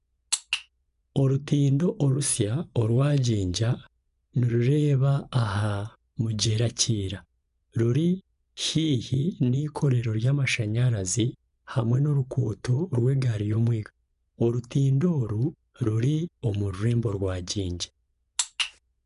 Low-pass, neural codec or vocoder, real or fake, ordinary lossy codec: 10.8 kHz; vocoder, 24 kHz, 100 mel bands, Vocos; fake; none